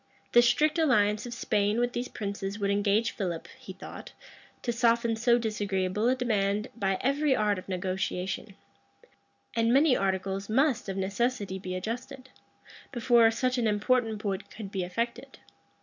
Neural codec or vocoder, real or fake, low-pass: none; real; 7.2 kHz